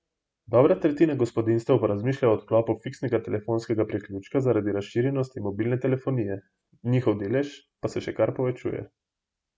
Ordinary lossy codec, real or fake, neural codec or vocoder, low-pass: none; real; none; none